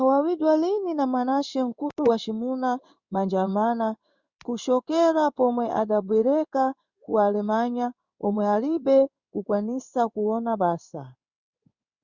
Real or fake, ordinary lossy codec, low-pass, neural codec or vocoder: fake; Opus, 64 kbps; 7.2 kHz; codec, 16 kHz in and 24 kHz out, 1 kbps, XY-Tokenizer